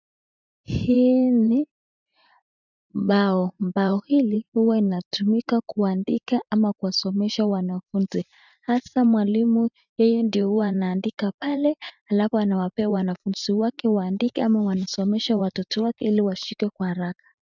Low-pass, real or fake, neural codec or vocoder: 7.2 kHz; fake; vocoder, 44.1 kHz, 128 mel bands every 512 samples, BigVGAN v2